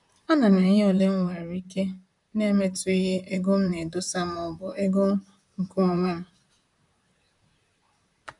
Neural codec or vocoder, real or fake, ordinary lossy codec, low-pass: vocoder, 44.1 kHz, 128 mel bands, Pupu-Vocoder; fake; none; 10.8 kHz